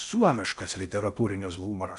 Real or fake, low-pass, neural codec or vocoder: fake; 10.8 kHz; codec, 16 kHz in and 24 kHz out, 0.6 kbps, FocalCodec, streaming, 4096 codes